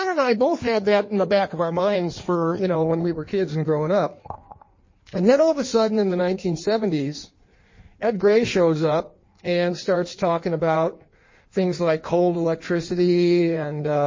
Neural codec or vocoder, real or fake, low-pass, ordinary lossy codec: codec, 16 kHz in and 24 kHz out, 1.1 kbps, FireRedTTS-2 codec; fake; 7.2 kHz; MP3, 32 kbps